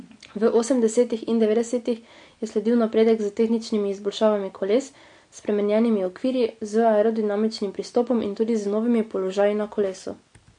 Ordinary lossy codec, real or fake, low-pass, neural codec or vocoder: MP3, 48 kbps; real; 9.9 kHz; none